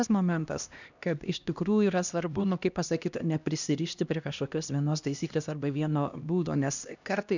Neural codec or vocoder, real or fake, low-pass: codec, 16 kHz, 1 kbps, X-Codec, HuBERT features, trained on LibriSpeech; fake; 7.2 kHz